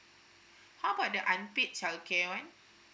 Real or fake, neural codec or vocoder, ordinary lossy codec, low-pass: real; none; none; none